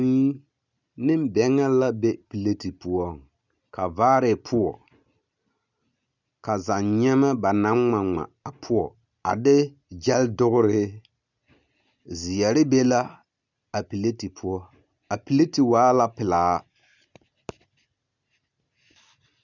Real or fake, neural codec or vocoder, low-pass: real; none; 7.2 kHz